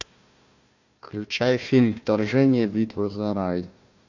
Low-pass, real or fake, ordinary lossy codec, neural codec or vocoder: 7.2 kHz; fake; AAC, 48 kbps; codec, 16 kHz, 1 kbps, FunCodec, trained on Chinese and English, 50 frames a second